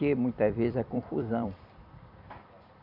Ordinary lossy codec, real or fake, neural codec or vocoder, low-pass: none; fake; vocoder, 44.1 kHz, 128 mel bands every 256 samples, BigVGAN v2; 5.4 kHz